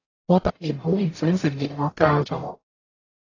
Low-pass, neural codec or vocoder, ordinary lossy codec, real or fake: 7.2 kHz; codec, 44.1 kHz, 0.9 kbps, DAC; AAC, 32 kbps; fake